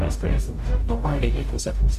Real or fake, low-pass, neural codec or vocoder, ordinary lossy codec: fake; 14.4 kHz; codec, 44.1 kHz, 0.9 kbps, DAC; AAC, 96 kbps